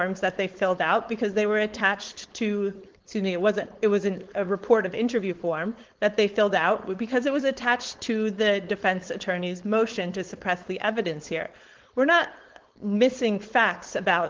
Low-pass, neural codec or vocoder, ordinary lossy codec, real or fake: 7.2 kHz; codec, 16 kHz, 4.8 kbps, FACodec; Opus, 16 kbps; fake